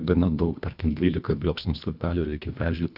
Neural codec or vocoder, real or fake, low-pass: codec, 24 kHz, 1.5 kbps, HILCodec; fake; 5.4 kHz